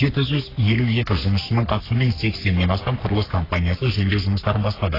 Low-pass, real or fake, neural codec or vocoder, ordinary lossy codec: 5.4 kHz; fake; codec, 44.1 kHz, 3.4 kbps, Pupu-Codec; AAC, 24 kbps